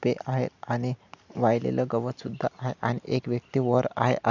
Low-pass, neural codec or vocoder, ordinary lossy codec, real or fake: 7.2 kHz; none; none; real